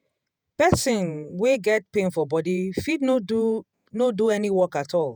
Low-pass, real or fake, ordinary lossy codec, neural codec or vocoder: none; fake; none; vocoder, 48 kHz, 128 mel bands, Vocos